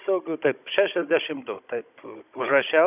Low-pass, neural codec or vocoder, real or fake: 3.6 kHz; codec, 16 kHz, 16 kbps, FunCodec, trained on Chinese and English, 50 frames a second; fake